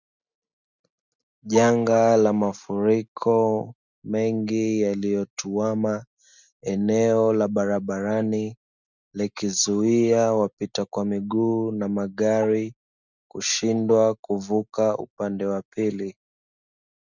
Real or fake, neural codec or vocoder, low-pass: real; none; 7.2 kHz